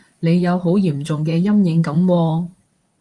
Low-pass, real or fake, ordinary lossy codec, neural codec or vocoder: 10.8 kHz; fake; Opus, 32 kbps; vocoder, 44.1 kHz, 128 mel bands, Pupu-Vocoder